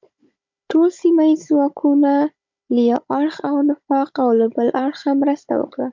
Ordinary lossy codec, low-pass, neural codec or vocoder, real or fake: MP3, 64 kbps; 7.2 kHz; codec, 16 kHz, 16 kbps, FunCodec, trained on Chinese and English, 50 frames a second; fake